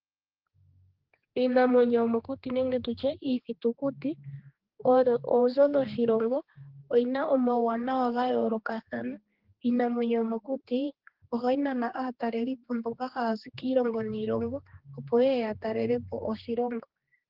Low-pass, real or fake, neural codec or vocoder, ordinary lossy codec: 5.4 kHz; fake; codec, 16 kHz, 2 kbps, X-Codec, HuBERT features, trained on general audio; Opus, 16 kbps